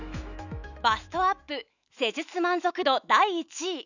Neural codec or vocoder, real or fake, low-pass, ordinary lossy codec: autoencoder, 48 kHz, 128 numbers a frame, DAC-VAE, trained on Japanese speech; fake; 7.2 kHz; none